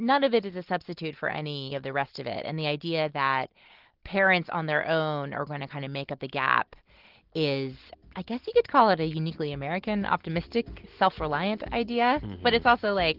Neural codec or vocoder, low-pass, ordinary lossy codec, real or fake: none; 5.4 kHz; Opus, 16 kbps; real